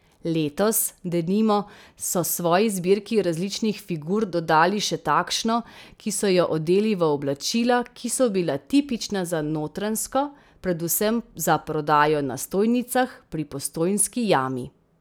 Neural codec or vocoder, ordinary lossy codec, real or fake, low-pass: none; none; real; none